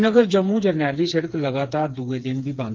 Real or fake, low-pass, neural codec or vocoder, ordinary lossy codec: fake; 7.2 kHz; codec, 16 kHz, 4 kbps, FreqCodec, smaller model; Opus, 24 kbps